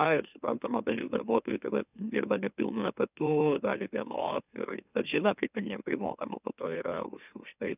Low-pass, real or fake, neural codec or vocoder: 3.6 kHz; fake; autoencoder, 44.1 kHz, a latent of 192 numbers a frame, MeloTTS